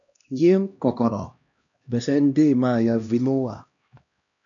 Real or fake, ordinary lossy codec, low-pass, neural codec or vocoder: fake; AAC, 64 kbps; 7.2 kHz; codec, 16 kHz, 1 kbps, X-Codec, HuBERT features, trained on LibriSpeech